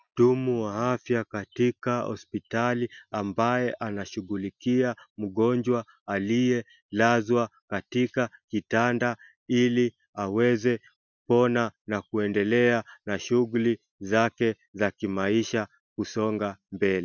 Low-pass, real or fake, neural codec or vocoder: 7.2 kHz; real; none